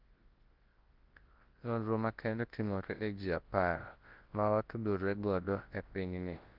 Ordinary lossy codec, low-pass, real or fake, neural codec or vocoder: Opus, 16 kbps; 5.4 kHz; fake; codec, 24 kHz, 0.9 kbps, WavTokenizer, large speech release